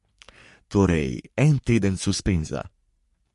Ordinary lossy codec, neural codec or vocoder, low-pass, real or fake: MP3, 48 kbps; codec, 44.1 kHz, 3.4 kbps, Pupu-Codec; 14.4 kHz; fake